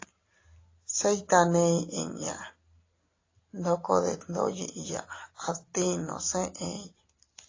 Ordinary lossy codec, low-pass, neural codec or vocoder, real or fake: AAC, 32 kbps; 7.2 kHz; none; real